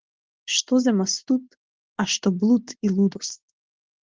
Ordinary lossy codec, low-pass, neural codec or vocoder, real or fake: Opus, 16 kbps; 7.2 kHz; none; real